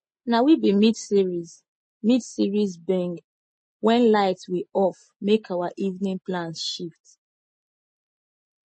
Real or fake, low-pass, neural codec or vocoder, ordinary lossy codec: real; 10.8 kHz; none; MP3, 32 kbps